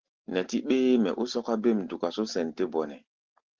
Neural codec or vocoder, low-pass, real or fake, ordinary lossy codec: none; 7.2 kHz; real; Opus, 16 kbps